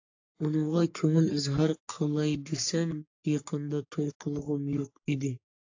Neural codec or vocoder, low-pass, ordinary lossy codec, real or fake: codec, 44.1 kHz, 3.4 kbps, Pupu-Codec; 7.2 kHz; AAC, 48 kbps; fake